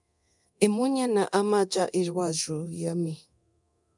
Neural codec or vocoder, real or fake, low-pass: codec, 24 kHz, 0.9 kbps, DualCodec; fake; 10.8 kHz